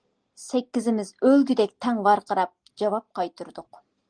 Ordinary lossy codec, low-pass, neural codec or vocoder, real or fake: Opus, 24 kbps; 9.9 kHz; none; real